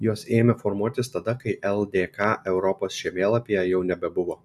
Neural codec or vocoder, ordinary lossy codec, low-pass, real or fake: none; AAC, 96 kbps; 14.4 kHz; real